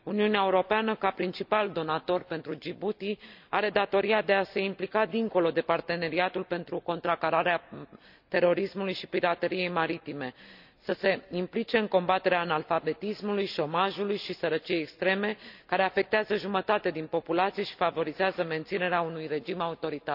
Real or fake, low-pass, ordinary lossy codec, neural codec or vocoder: real; 5.4 kHz; none; none